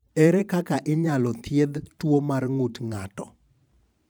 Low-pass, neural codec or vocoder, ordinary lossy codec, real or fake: none; vocoder, 44.1 kHz, 128 mel bands every 512 samples, BigVGAN v2; none; fake